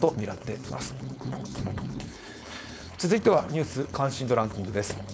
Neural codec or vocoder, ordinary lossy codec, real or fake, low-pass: codec, 16 kHz, 4.8 kbps, FACodec; none; fake; none